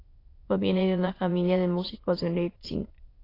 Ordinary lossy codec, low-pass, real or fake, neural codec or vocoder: AAC, 24 kbps; 5.4 kHz; fake; autoencoder, 22.05 kHz, a latent of 192 numbers a frame, VITS, trained on many speakers